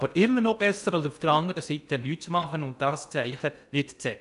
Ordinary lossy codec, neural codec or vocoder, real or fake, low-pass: none; codec, 16 kHz in and 24 kHz out, 0.6 kbps, FocalCodec, streaming, 2048 codes; fake; 10.8 kHz